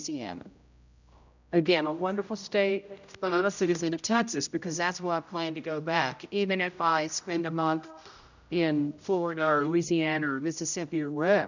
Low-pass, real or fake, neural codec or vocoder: 7.2 kHz; fake; codec, 16 kHz, 0.5 kbps, X-Codec, HuBERT features, trained on general audio